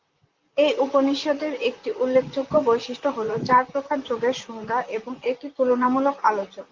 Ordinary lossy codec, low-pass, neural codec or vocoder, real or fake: Opus, 24 kbps; 7.2 kHz; vocoder, 44.1 kHz, 128 mel bands, Pupu-Vocoder; fake